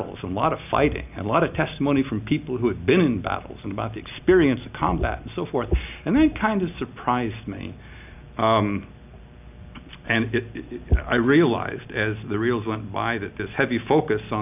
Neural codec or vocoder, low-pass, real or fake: none; 3.6 kHz; real